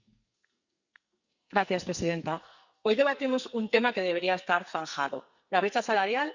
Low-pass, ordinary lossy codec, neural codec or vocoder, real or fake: 7.2 kHz; Opus, 64 kbps; codec, 44.1 kHz, 2.6 kbps, SNAC; fake